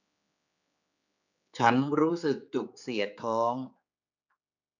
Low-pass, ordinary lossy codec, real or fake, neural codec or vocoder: 7.2 kHz; none; fake; codec, 16 kHz, 4 kbps, X-Codec, HuBERT features, trained on general audio